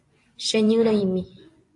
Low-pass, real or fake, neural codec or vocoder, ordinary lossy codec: 10.8 kHz; fake; vocoder, 24 kHz, 100 mel bands, Vocos; AAC, 48 kbps